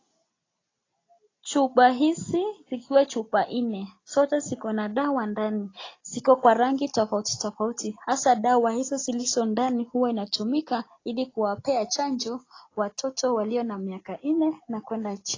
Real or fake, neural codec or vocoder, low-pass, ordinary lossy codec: real; none; 7.2 kHz; AAC, 32 kbps